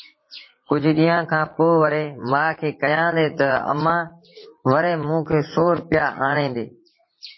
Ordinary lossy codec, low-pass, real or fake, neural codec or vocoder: MP3, 24 kbps; 7.2 kHz; fake; vocoder, 44.1 kHz, 80 mel bands, Vocos